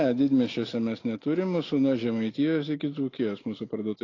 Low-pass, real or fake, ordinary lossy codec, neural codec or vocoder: 7.2 kHz; real; AAC, 32 kbps; none